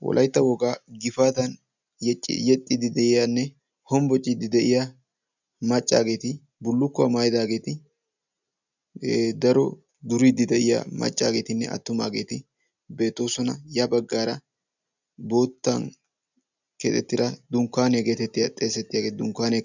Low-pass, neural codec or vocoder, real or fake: 7.2 kHz; none; real